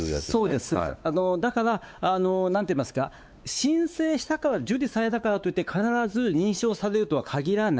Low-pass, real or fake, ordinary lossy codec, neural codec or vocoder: none; fake; none; codec, 16 kHz, 4 kbps, X-Codec, WavLM features, trained on Multilingual LibriSpeech